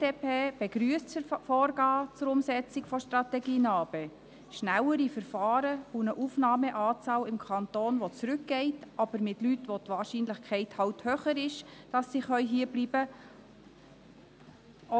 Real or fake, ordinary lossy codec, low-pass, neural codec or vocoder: real; none; none; none